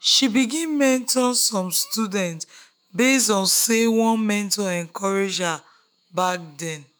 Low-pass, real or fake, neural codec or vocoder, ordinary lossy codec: none; fake; autoencoder, 48 kHz, 128 numbers a frame, DAC-VAE, trained on Japanese speech; none